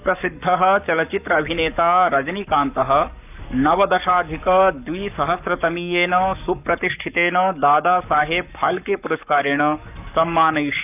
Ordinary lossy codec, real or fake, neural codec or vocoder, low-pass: none; fake; codec, 44.1 kHz, 7.8 kbps, Pupu-Codec; 3.6 kHz